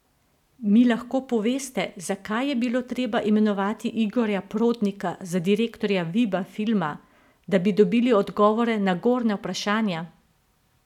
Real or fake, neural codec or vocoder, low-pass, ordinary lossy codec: real; none; 19.8 kHz; none